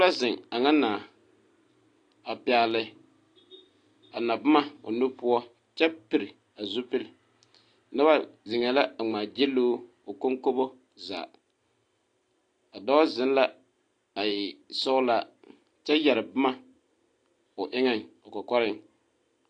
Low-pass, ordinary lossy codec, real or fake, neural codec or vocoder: 9.9 kHz; AAC, 48 kbps; real; none